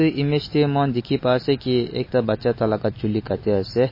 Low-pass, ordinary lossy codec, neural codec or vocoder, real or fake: 5.4 kHz; MP3, 24 kbps; none; real